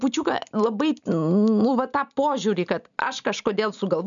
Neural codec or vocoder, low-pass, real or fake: none; 7.2 kHz; real